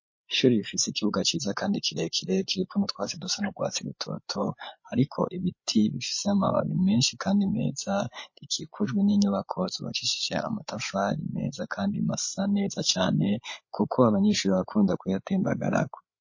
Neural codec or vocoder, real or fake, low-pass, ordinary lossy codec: codec, 16 kHz, 8 kbps, FreqCodec, larger model; fake; 7.2 kHz; MP3, 32 kbps